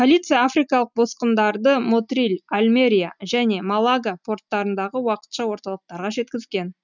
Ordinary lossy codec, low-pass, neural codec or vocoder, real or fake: none; 7.2 kHz; none; real